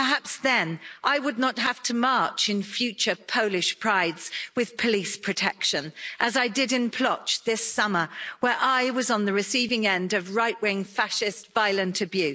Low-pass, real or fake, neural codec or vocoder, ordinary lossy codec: none; real; none; none